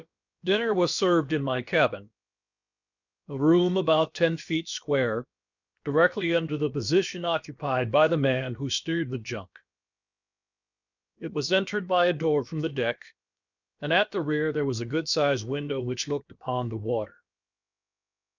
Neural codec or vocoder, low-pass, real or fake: codec, 16 kHz, about 1 kbps, DyCAST, with the encoder's durations; 7.2 kHz; fake